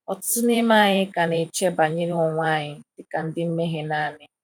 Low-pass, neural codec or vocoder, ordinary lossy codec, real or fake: 19.8 kHz; vocoder, 44.1 kHz, 128 mel bands every 512 samples, BigVGAN v2; none; fake